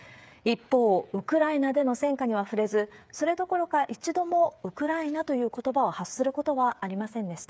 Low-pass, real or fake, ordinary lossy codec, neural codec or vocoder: none; fake; none; codec, 16 kHz, 8 kbps, FreqCodec, smaller model